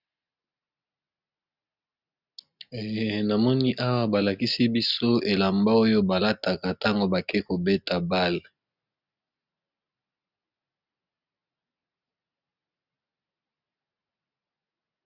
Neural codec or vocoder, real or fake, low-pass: none; real; 5.4 kHz